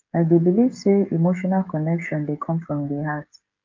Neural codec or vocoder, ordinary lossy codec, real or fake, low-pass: codec, 16 kHz, 16 kbps, FreqCodec, smaller model; Opus, 32 kbps; fake; 7.2 kHz